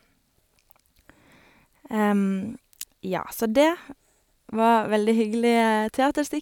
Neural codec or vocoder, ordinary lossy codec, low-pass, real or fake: none; none; 19.8 kHz; real